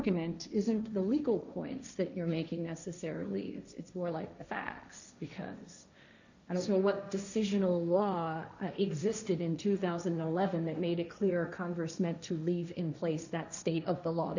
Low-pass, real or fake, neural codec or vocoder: 7.2 kHz; fake; codec, 16 kHz, 1.1 kbps, Voila-Tokenizer